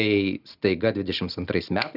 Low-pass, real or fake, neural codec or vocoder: 5.4 kHz; real; none